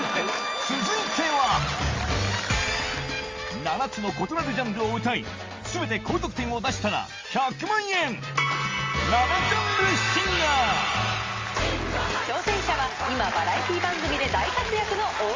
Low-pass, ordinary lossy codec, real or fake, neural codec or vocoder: 7.2 kHz; Opus, 32 kbps; real; none